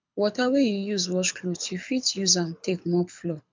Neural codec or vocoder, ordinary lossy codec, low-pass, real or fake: codec, 24 kHz, 6 kbps, HILCodec; MP3, 64 kbps; 7.2 kHz; fake